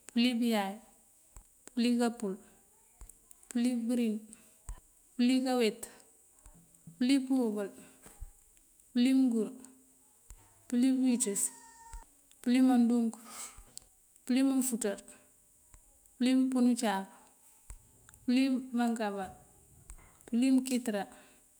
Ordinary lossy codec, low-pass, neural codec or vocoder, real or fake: none; none; autoencoder, 48 kHz, 128 numbers a frame, DAC-VAE, trained on Japanese speech; fake